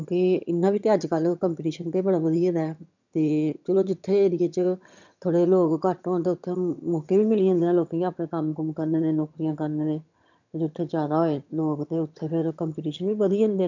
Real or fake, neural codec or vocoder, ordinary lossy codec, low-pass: fake; vocoder, 22.05 kHz, 80 mel bands, HiFi-GAN; none; 7.2 kHz